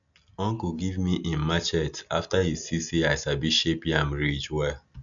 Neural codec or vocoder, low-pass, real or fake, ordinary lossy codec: none; 7.2 kHz; real; none